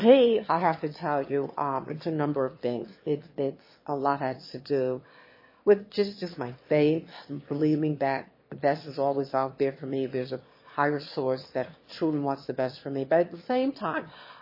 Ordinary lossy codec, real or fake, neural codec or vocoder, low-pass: MP3, 24 kbps; fake; autoencoder, 22.05 kHz, a latent of 192 numbers a frame, VITS, trained on one speaker; 5.4 kHz